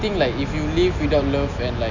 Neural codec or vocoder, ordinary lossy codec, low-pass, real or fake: none; none; 7.2 kHz; real